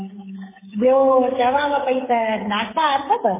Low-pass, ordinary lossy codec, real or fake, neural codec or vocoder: 3.6 kHz; MP3, 16 kbps; fake; codec, 16 kHz, 16 kbps, FreqCodec, smaller model